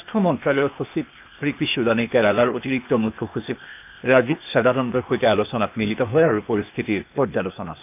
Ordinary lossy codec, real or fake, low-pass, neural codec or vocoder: none; fake; 3.6 kHz; codec, 16 kHz, 0.8 kbps, ZipCodec